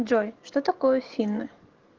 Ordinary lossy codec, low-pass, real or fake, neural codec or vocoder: Opus, 16 kbps; 7.2 kHz; real; none